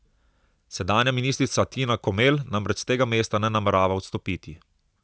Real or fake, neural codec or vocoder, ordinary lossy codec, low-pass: real; none; none; none